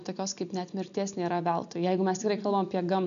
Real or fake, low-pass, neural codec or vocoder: real; 7.2 kHz; none